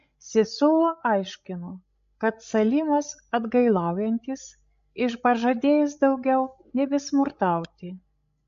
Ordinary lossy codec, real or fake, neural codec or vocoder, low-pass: MP3, 48 kbps; fake; codec, 16 kHz, 16 kbps, FreqCodec, larger model; 7.2 kHz